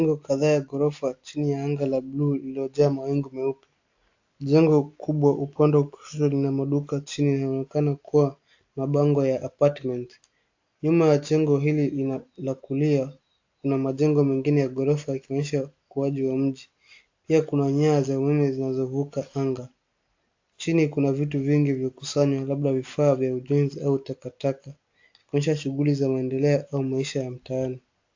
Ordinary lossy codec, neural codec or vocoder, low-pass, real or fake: AAC, 48 kbps; none; 7.2 kHz; real